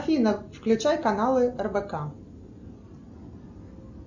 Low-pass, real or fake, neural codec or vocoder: 7.2 kHz; real; none